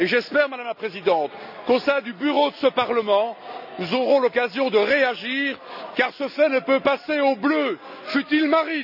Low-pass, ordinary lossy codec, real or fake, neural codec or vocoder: 5.4 kHz; none; real; none